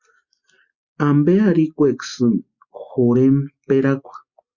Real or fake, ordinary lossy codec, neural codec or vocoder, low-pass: real; Opus, 64 kbps; none; 7.2 kHz